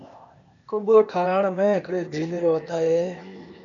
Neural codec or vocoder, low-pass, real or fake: codec, 16 kHz, 0.8 kbps, ZipCodec; 7.2 kHz; fake